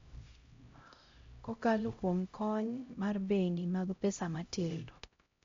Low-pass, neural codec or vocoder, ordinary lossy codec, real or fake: 7.2 kHz; codec, 16 kHz, 0.5 kbps, X-Codec, HuBERT features, trained on LibriSpeech; MP3, 48 kbps; fake